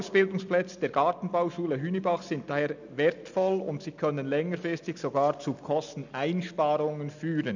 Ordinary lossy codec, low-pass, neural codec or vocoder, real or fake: none; 7.2 kHz; none; real